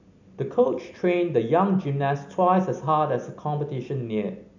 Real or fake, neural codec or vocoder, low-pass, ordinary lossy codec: real; none; 7.2 kHz; none